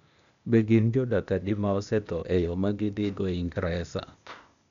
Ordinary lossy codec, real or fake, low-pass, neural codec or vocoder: MP3, 96 kbps; fake; 7.2 kHz; codec, 16 kHz, 0.8 kbps, ZipCodec